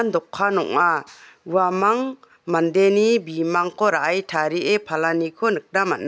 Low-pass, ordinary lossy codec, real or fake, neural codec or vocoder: none; none; real; none